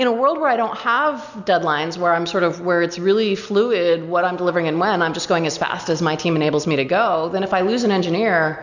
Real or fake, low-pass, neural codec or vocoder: real; 7.2 kHz; none